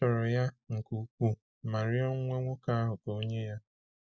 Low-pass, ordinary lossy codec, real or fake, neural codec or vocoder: none; none; real; none